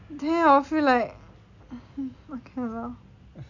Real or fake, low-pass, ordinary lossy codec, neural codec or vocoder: real; 7.2 kHz; none; none